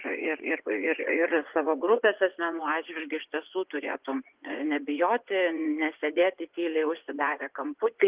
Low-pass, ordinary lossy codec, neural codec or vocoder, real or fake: 3.6 kHz; Opus, 32 kbps; vocoder, 24 kHz, 100 mel bands, Vocos; fake